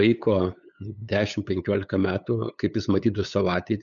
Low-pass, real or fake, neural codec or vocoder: 7.2 kHz; fake; codec, 16 kHz, 8 kbps, FunCodec, trained on LibriTTS, 25 frames a second